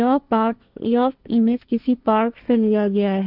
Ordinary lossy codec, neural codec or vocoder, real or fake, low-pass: none; codec, 16 kHz, 1.1 kbps, Voila-Tokenizer; fake; 5.4 kHz